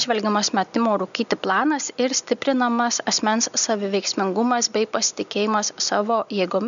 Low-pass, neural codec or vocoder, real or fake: 7.2 kHz; none; real